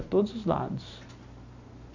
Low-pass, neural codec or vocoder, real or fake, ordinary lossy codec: 7.2 kHz; none; real; none